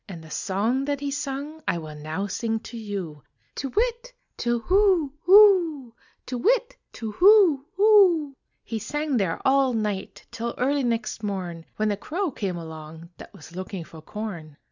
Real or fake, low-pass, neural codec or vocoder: real; 7.2 kHz; none